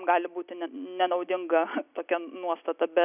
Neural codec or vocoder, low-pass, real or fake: none; 3.6 kHz; real